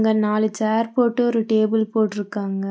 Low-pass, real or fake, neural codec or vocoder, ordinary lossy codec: none; real; none; none